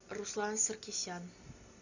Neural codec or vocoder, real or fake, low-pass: none; real; 7.2 kHz